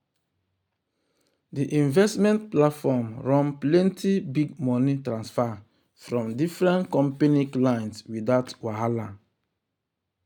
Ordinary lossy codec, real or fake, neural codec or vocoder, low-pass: none; real; none; 19.8 kHz